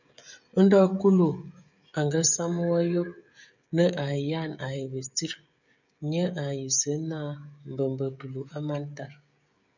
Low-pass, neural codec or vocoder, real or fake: 7.2 kHz; codec, 16 kHz, 16 kbps, FreqCodec, smaller model; fake